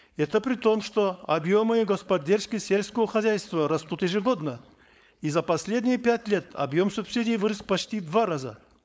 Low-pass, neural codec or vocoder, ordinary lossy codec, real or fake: none; codec, 16 kHz, 4.8 kbps, FACodec; none; fake